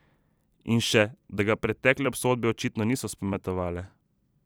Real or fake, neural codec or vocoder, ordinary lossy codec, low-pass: fake; vocoder, 44.1 kHz, 128 mel bands every 256 samples, BigVGAN v2; none; none